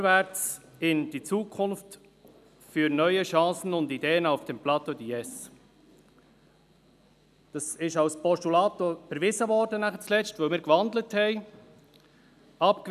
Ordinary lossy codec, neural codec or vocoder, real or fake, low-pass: none; none; real; 14.4 kHz